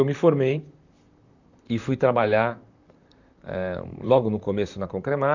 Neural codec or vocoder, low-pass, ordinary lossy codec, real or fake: vocoder, 44.1 kHz, 128 mel bands, Pupu-Vocoder; 7.2 kHz; none; fake